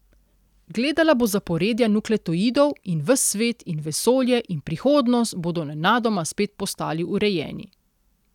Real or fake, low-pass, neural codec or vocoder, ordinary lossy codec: real; 19.8 kHz; none; none